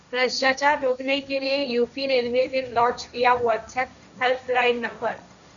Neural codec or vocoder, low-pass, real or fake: codec, 16 kHz, 1.1 kbps, Voila-Tokenizer; 7.2 kHz; fake